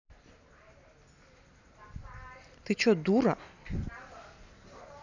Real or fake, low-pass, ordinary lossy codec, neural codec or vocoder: real; 7.2 kHz; none; none